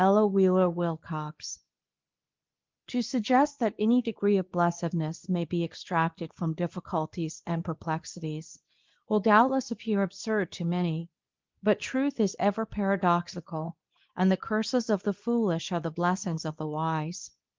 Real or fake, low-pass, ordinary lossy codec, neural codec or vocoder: fake; 7.2 kHz; Opus, 32 kbps; codec, 24 kHz, 0.9 kbps, WavTokenizer, medium speech release version 2